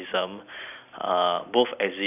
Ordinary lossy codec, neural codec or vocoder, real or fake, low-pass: none; none; real; 3.6 kHz